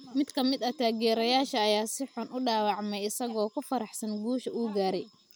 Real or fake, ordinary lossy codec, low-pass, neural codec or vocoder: real; none; none; none